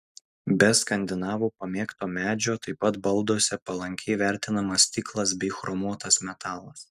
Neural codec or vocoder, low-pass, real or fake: none; 14.4 kHz; real